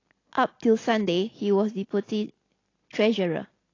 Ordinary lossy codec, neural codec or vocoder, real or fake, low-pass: AAC, 32 kbps; none; real; 7.2 kHz